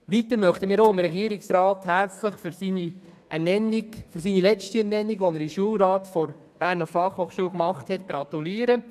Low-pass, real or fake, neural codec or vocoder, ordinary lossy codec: 14.4 kHz; fake; codec, 32 kHz, 1.9 kbps, SNAC; none